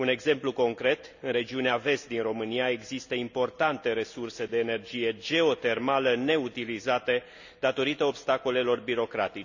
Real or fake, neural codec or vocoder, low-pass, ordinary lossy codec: real; none; 7.2 kHz; none